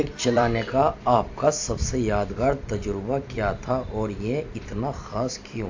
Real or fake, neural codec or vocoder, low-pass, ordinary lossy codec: fake; vocoder, 44.1 kHz, 128 mel bands every 256 samples, BigVGAN v2; 7.2 kHz; AAC, 48 kbps